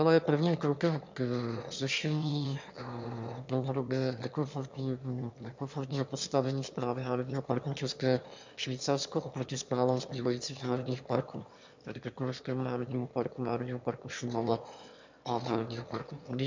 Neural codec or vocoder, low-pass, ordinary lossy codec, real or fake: autoencoder, 22.05 kHz, a latent of 192 numbers a frame, VITS, trained on one speaker; 7.2 kHz; MP3, 64 kbps; fake